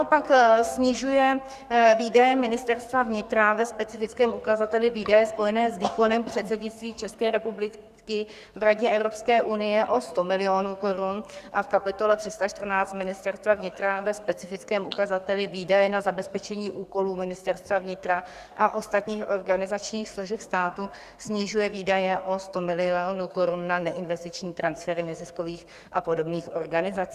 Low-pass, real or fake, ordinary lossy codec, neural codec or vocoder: 14.4 kHz; fake; Opus, 64 kbps; codec, 44.1 kHz, 2.6 kbps, SNAC